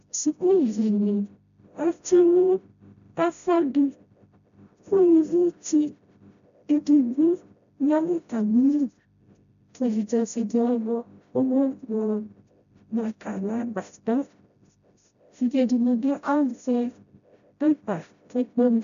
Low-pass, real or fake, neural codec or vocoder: 7.2 kHz; fake; codec, 16 kHz, 0.5 kbps, FreqCodec, smaller model